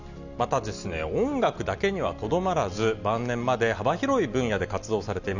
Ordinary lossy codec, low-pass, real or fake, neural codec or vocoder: none; 7.2 kHz; real; none